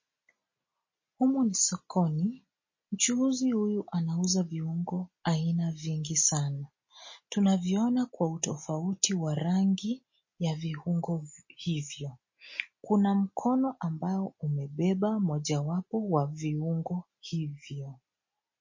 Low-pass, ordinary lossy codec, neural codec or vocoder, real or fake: 7.2 kHz; MP3, 32 kbps; none; real